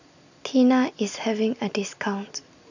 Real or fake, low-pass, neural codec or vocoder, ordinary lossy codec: real; 7.2 kHz; none; none